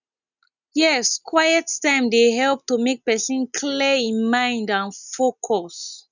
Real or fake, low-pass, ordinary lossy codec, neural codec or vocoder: real; 7.2 kHz; none; none